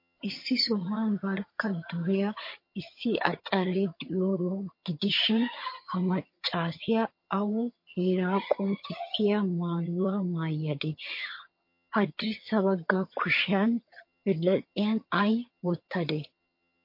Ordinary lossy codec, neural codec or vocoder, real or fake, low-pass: MP3, 32 kbps; vocoder, 22.05 kHz, 80 mel bands, HiFi-GAN; fake; 5.4 kHz